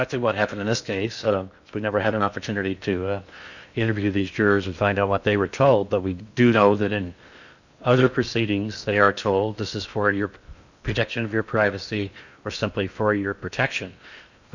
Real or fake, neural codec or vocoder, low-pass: fake; codec, 16 kHz in and 24 kHz out, 0.8 kbps, FocalCodec, streaming, 65536 codes; 7.2 kHz